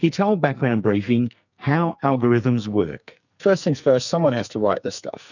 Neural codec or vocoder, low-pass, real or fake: codec, 44.1 kHz, 2.6 kbps, SNAC; 7.2 kHz; fake